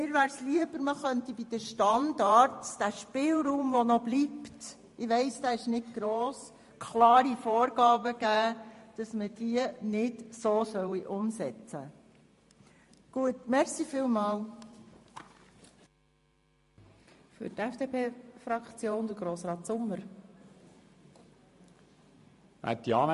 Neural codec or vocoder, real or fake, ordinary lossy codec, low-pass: vocoder, 44.1 kHz, 128 mel bands every 512 samples, BigVGAN v2; fake; MP3, 48 kbps; 14.4 kHz